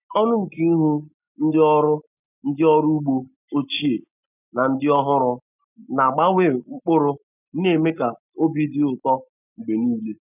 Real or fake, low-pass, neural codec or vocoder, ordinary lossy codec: real; 3.6 kHz; none; none